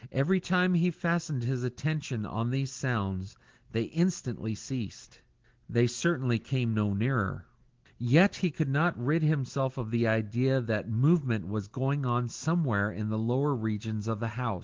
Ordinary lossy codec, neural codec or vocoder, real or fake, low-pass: Opus, 16 kbps; none; real; 7.2 kHz